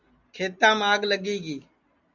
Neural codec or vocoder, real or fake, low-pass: none; real; 7.2 kHz